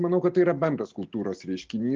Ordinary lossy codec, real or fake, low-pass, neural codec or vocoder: Opus, 24 kbps; real; 7.2 kHz; none